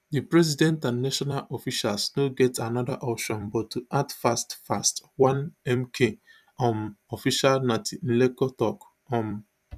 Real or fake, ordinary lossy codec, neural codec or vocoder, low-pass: fake; none; vocoder, 44.1 kHz, 128 mel bands every 256 samples, BigVGAN v2; 14.4 kHz